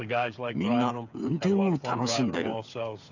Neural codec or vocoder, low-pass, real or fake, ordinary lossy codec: codec, 16 kHz, 8 kbps, FreqCodec, smaller model; 7.2 kHz; fake; none